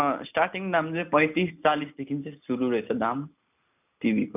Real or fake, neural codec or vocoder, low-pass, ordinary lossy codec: real; none; 3.6 kHz; none